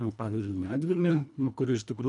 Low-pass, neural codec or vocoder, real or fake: 10.8 kHz; codec, 24 kHz, 1.5 kbps, HILCodec; fake